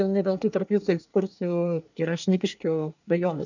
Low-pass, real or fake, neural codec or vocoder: 7.2 kHz; fake; codec, 24 kHz, 1 kbps, SNAC